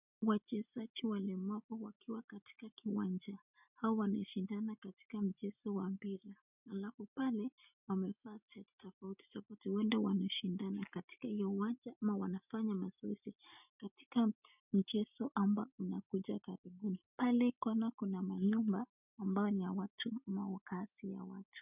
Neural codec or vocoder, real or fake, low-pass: none; real; 3.6 kHz